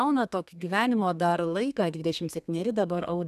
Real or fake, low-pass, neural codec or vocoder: fake; 14.4 kHz; codec, 32 kHz, 1.9 kbps, SNAC